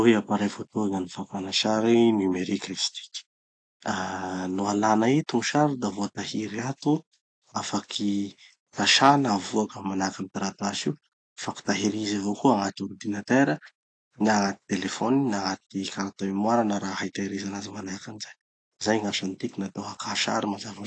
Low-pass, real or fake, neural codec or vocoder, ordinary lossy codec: 9.9 kHz; real; none; AAC, 48 kbps